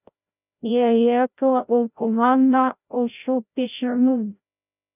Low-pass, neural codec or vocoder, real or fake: 3.6 kHz; codec, 16 kHz, 0.5 kbps, FreqCodec, larger model; fake